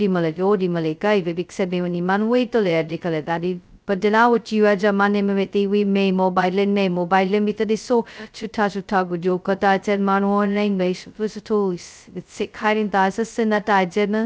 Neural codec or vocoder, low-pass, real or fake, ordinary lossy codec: codec, 16 kHz, 0.2 kbps, FocalCodec; none; fake; none